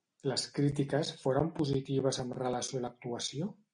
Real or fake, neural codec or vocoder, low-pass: real; none; 9.9 kHz